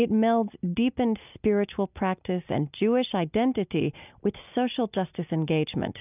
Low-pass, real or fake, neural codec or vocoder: 3.6 kHz; real; none